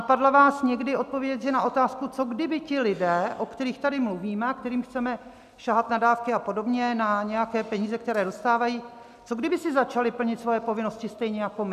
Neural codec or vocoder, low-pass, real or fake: none; 14.4 kHz; real